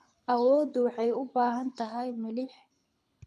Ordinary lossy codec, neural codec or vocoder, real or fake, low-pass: none; codec, 24 kHz, 6 kbps, HILCodec; fake; none